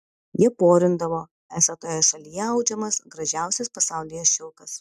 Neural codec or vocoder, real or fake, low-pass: none; real; 14.4 kHz